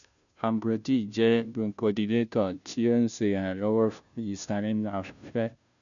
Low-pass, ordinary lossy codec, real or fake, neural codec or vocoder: 7.2 kHz; none; fake; codec, 16 kHz, 0.5 kbps, FunCodec, trained on Chinese and English, 25 frames a second